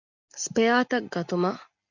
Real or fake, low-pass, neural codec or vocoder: real; 7.2 kHz; none